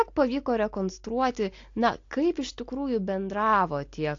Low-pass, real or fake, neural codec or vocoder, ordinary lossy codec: 7.2 kHz; real; none; AAC, 48 kbps